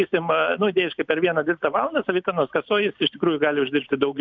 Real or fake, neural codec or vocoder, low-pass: real; none; 7.2 kHz